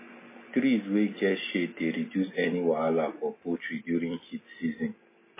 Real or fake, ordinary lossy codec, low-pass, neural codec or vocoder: real; MP3, 16 kbps; 3.6 kHz; none